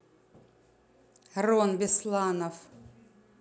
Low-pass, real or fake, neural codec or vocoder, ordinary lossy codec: none; real; none; none